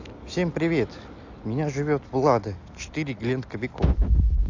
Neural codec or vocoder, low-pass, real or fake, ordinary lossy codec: none; 7.2 kHz; real; AAC, 48 kbps